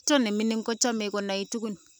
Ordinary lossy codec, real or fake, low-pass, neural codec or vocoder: none; real; none; none